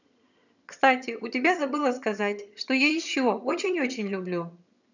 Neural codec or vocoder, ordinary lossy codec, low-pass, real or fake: vocoder, 22.05 kHz, 80 mel bands, HiFi-GAN; none; 7.2 kHz; fake